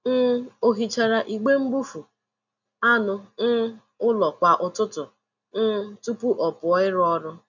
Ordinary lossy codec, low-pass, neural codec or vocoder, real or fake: none; 7.2 kHz; none; real